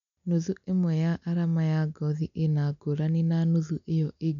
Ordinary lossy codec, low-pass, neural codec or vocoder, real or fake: none; 7.2 kHz; none; real